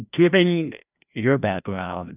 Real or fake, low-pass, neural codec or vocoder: fake; 3.6 kHz; codec, 16 kHz, 1 kbps, FreqCodec, larger model